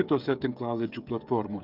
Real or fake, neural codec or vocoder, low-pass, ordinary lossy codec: fake; codec, 16 kHz, 16 kbps, FreqCodec, smaller model; 5.4 kHz; Opus, 24 kbps